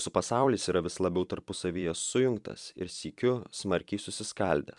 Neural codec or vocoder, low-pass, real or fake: vocoder, 44.1 kHz, 128 mel bands every 256 samples, BigVGAN v2; 10.8 kHz; fake